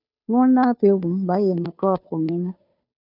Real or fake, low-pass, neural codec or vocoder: fake; 5.4 kHz; codec, 16 kHz, 2 kbps, FunCodec, trained on Chinese and English, 25 frames a second